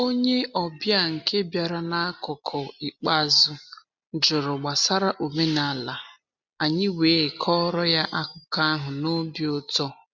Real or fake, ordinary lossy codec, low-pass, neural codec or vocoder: real; MP3, 64 kbps; 7.2 kHz; none